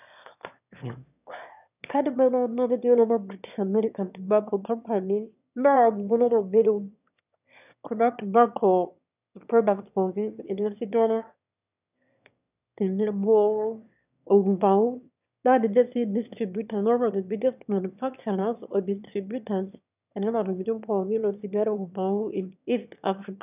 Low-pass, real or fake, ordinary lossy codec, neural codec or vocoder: 3.6 kHz; fake; none; autoencoder, 22.05 kHz, a latent of 192 numbers a frame, VITS, trained on one speaker